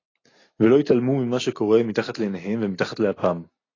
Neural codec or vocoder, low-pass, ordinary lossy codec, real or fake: none; 7.2 kHz; AAC, 32 kbps; real